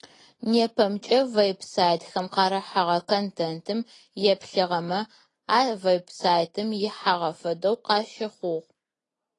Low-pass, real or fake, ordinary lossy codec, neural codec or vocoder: 10.8 kHz; real; AAC, 32 kbps; none